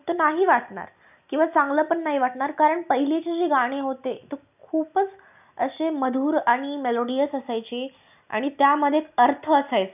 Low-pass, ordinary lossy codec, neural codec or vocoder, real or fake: 3.6 kHz; none; none; real